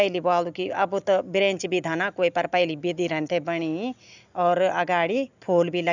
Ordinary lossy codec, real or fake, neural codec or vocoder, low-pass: none; real; none; 7.2 kHz